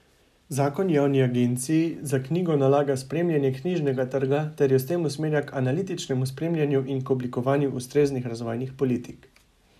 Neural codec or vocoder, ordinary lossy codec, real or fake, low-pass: none; none; real; 14.4 kHz